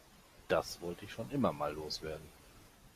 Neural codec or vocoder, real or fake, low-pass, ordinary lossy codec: none; real; 14.4 kHz; Opus, 64 kbps